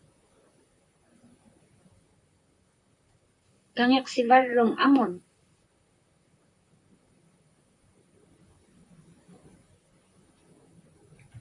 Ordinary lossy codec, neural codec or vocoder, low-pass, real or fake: MP3, 96 kbps; vocoder, 44.1 kHz, 128 mel bands, Pupu-Vocoder; 10.8 kHz; fake